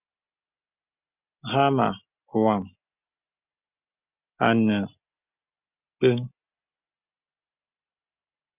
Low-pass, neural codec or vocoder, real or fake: 3.6 kHz; none; real